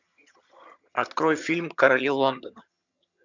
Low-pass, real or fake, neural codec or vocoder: 7.2 kHz; fake; vocoder, 22.05 kHz, 80 mel bands, HiFi-GAN